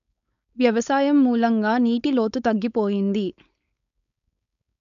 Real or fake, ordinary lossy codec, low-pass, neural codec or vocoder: fake; none; 7.2 kHz; codec, 16 kHz, 4.8 kbps, FACodec